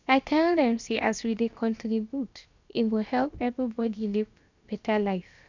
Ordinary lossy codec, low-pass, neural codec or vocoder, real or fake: none; 7.2 kHz; codec, 16 kHz, about 1 kbps, DyCAST, with the encoder's durations; fake